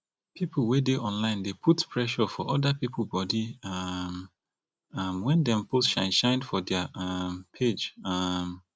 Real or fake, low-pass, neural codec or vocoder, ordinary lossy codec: real; none; none; none